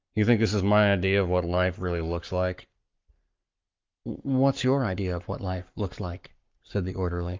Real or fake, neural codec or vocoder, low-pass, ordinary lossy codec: fake; codec, 44.1 kHz, 7.8 kbps, Pupu-Codec; 7.2 kHz; Opus, 24 kbps